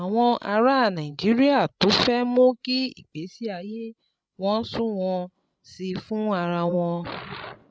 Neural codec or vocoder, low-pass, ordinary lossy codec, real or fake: codec, 16 kHz, 16 kbps, FreqCodec, larger model; none; none; fake